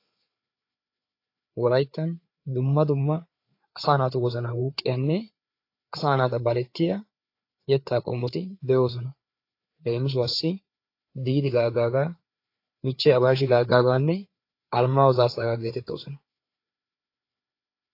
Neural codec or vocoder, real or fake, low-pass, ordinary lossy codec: codec, 16 kHz, 4 kbps, FreqCodec, larger model; fake; 5.4 kHz; AAC, 32 kbps